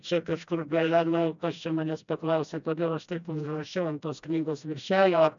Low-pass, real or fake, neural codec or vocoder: 7.2 kHz; fake; codec, 16 kHz, 1 kbps, FreqCodec, smaller model